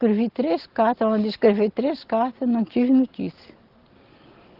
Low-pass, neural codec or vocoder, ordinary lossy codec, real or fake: 5.4 kHz; none; Opus, 16 kbps; real